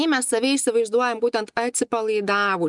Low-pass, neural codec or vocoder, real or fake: 10.8 kHz; none; real